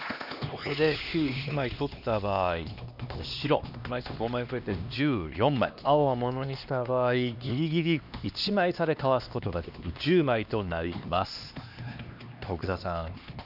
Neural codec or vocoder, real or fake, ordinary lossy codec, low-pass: codec, 16 kHz, 2 kbps, X-Codec, HuBERT features, trained on LibriSpeech; fake; none; 5.4 kHz